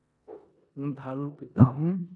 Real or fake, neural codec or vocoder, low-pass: fake; codec, 16 kHz in and 24 kHz out, 0.9 kbps, LongCat-Audio-Codec, four codebook decoder; 10.8 kHz